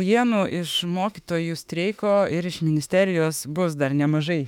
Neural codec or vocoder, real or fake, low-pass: autoencoder, 48 kHz, 32 numbers a frame, DAC-VAE, trained on Japanese speech; fake; 19.8 kHz